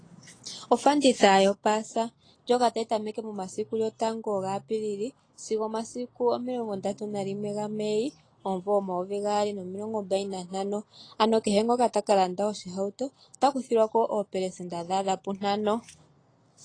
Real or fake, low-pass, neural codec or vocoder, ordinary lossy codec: real; 9.9 kHz; none; AAC, 32 kbps